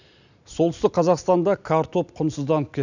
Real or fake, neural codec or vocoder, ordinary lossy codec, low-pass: real; none; none; 7.2 kHz